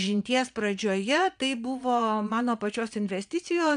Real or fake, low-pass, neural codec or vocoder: fake; 9.9 kHz; vocoder, 22.05 kHz, 80 mel bands, Vocos